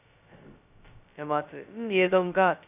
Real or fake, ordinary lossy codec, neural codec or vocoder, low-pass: fake; none; codec, 16 kHz, 0.2 kbps, FocalCodec; 3.6 kHz